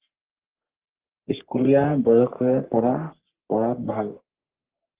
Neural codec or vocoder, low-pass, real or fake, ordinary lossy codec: codec, 44.1 kHz, 3.4 kbps, Pupu-Codec; 3.6 kHz; fake; Opus, 16 kbps